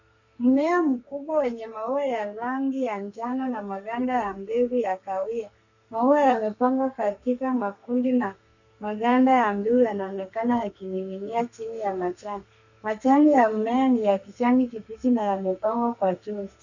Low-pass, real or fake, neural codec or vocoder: 7.2 kHz; fake; codec, 32 kHz, 1.9 kbps, SNAC